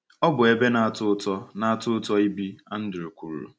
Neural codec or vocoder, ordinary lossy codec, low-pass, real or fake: none; none; none; real